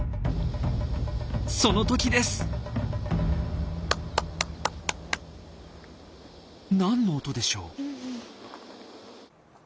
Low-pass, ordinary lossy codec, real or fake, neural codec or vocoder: none; none; real; none